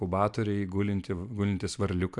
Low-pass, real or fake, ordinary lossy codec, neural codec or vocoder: 10.8 kHz; real; MP3, 96 kbps; none